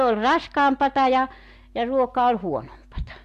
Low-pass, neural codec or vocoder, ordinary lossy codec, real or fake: 14.4 kHz; none; AAC, 64 kbps; real